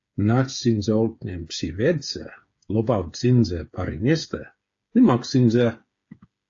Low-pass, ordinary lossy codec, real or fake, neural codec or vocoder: 7.2 kHz; AAC, 48 kbps; fake; codec, 16 kHz, 8 kbps, FreqCodec, smaller model